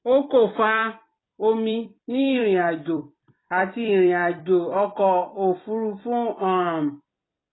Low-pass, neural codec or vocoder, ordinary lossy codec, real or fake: 7.2 kHz; vocoder, 44.1 kHz, 128 mel bands every 256 samples, BigVGAN v2; AAC, 16 kbps; fake